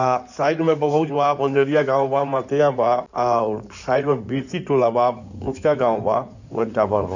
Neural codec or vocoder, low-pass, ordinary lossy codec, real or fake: codec, 16 kHz in and 24 kHz out, 2.2 kbps, FireRedTTS-2 codec; 7.2 kHz; none; fake